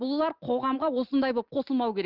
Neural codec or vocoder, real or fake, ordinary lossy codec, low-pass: none; real; Opus, 32 kbps; 5.4 kHz